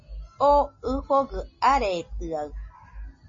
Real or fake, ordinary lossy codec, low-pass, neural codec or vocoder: real; MP3, 32 kbps; 7.2 kHz; none